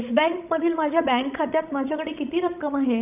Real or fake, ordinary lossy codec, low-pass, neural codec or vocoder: fake; none; 3.6 kHz; codec, 16 kHz, 16 kbps, FreqCodec, larger model